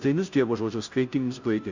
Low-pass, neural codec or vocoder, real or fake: 7.2 kHz; codec, 16 kHz, 0.5 kbps, FunCodec, trained on Chinese and English, 25 frames a second; fake